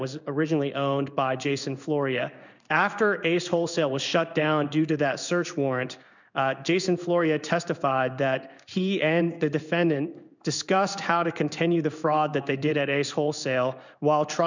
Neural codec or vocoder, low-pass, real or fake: codec, 16 kHz in and 24 kHz out, 1 kbps, XY-Tokenizer; 7.2 kHz; fake